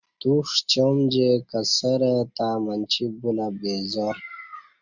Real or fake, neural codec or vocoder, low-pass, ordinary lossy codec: real; none; 7.2 kHz; Opus, 64 kbps